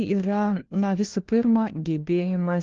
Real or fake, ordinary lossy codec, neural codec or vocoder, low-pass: fake; Opus, 16 kbps; codec, 16 kHz, 1 kbps, FunCodec, trained on LibriTTS, 50 frames a second; 7.2 kHz